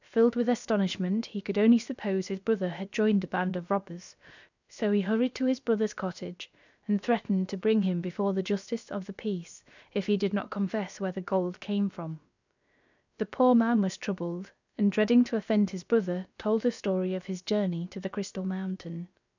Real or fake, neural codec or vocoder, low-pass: fake; codec, 16 kHz, 0.7 kbps, FocalCodec; 7.2 kHz